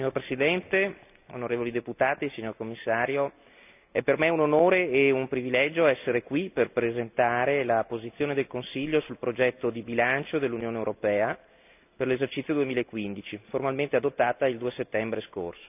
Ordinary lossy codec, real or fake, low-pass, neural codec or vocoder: none; real; 3.6 kHz; none